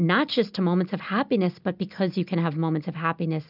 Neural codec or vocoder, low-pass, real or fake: none; 5.4 kHz; real